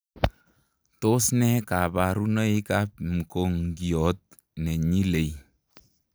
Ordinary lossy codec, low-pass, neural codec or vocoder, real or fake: none; none; none; real